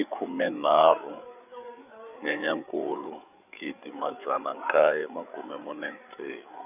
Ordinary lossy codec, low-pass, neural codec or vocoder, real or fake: none; 3.6 kHz; codec, 16 kHz, 8 kbps, FreqCodec, larger model; fake